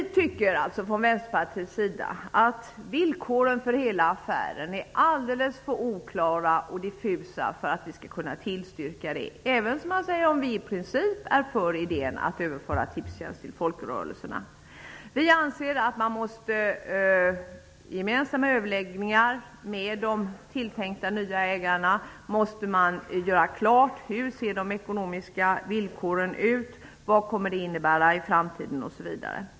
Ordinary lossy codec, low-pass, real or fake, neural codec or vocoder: none; none; real; none